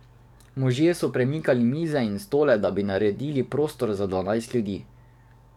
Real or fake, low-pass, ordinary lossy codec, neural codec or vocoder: fake; 19.8 kHz; none; codec, 44.1 kHz, 7.8 kbps, DAC